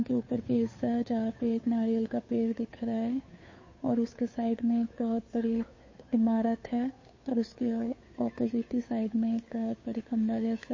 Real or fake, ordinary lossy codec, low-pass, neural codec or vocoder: fake; MP3, 32 kbps; 7.2 kHz; codec, 16 kHz, 2 kbps, FunCodec, trained on Chinese and English, 25 frames a second